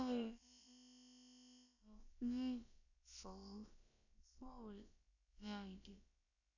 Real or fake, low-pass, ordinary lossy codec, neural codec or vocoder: fake; 7.2 kHz; none; codec, 16 kHz, about 1 kbps, DyCAST, with the encoder's durations